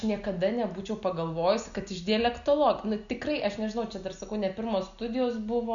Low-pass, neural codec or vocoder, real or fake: 7.2 kHz; none; real